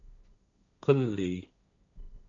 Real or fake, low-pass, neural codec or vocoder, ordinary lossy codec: fake; 7.2 kHz; codec, 16 kHz, 1.1 kbps, Voila-Tokenizer; AAC, 48 kbps